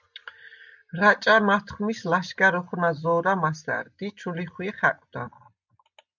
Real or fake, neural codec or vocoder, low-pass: real; none; 7.2 kHz